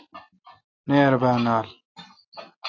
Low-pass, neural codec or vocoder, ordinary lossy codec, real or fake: 7.2 kHz; none; AAC, 48 kbps; real